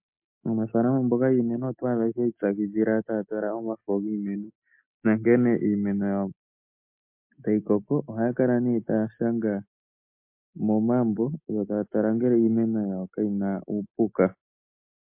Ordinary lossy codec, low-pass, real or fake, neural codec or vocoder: MP3, 32 kbps; 3.6 kHz; real; none